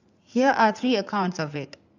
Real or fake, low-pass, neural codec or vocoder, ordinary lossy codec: fake; 7.2 kHz; codec, 16 kHz in and 24 kHz out, 2.2 kbps, FireRedTTS-2 codec; none